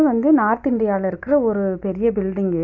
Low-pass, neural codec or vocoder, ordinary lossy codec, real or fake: 7.2 kHz; none; AAC, 48 kbps; real